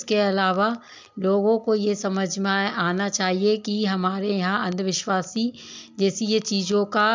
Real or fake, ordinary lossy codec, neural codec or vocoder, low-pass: real; MP3, 64 kbps; none; 7.2 kHz